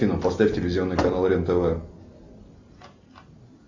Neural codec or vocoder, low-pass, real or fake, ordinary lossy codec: none; 7.2 kHz; real; MP3, 64 kbps